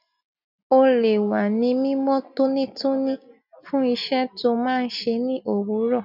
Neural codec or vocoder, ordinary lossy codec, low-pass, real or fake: none; none; 5.4 kHz; real